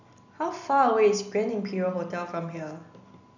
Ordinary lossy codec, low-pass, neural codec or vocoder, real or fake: none; 7.2 kHz; none; real